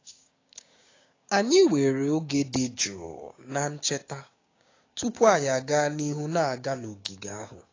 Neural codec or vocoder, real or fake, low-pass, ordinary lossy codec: codec, 16 kHz, 6 kbps, DAC; fake; 7.2 kHz; AAC, 32 kbps